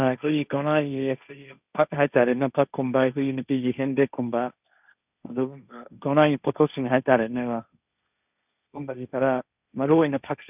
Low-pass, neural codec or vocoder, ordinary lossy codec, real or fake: 3.6 kHz; codec, 16 kHz, 1.1 kbps, Voila-Tokenizer; none; fake